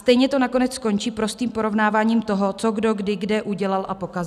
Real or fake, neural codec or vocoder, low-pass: real; none; 14.4 kHz